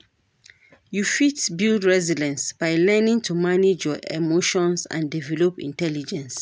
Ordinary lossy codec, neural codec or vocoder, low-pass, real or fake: none; none; none; real